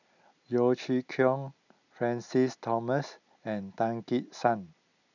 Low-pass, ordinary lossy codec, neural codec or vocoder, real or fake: 7.2 kHz; none; none; real